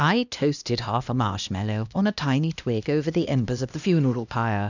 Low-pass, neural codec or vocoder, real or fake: 7.2 kHz; codec, 16 kHz, 1 kbps, X-Codec, HuBERT features, trained on LibriSpeech; fake